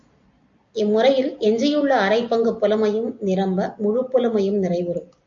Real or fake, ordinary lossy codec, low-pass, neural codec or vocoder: real; AAC, 64 kbps; 7.2 kHz; none